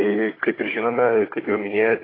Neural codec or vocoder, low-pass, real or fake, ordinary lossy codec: codec, 16 kHz, 16 kbps, FunCodec, trained on Chinese and English, 50 frames a second; 5.4 kHz; fake; AAC, 24 kbps